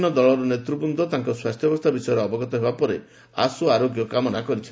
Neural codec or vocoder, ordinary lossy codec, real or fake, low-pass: none; none; real; none